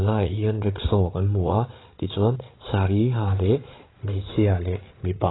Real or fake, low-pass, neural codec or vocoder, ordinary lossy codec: fake; 7.2 kHz; codec, 16 kHz, 8 kbps, FreqCodec, larger model; AAC, 16 kbps